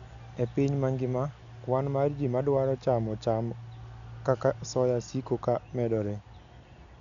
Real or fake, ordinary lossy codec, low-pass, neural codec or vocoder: real; none; 7.2 kHz; none